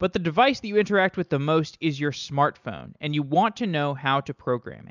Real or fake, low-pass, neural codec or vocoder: real; 7.2 kHz; none